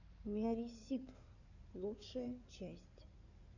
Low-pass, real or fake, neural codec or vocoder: 7.2 kHz; fake; codec, 16 kHz in and 24 kHz out, 2.2 kbps, FireRedTTS-2 codec